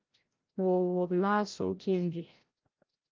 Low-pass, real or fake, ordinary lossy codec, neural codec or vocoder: 7.2 kHz; fake; Opus, 32 kbps; codec, 16 kHz, 0.5 kbps, FreqCodec, larger model